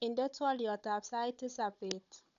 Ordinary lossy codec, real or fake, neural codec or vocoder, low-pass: none; fake; codec, 16 kHz, 16 kbps, FunCodec, trained on Chinese and English, 50 frames a second; 7.2 kHz